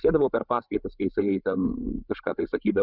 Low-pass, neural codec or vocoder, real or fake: 5.4 kHz; codec, 16 kHz, 16 kbps, FreqCodec, larger model; fake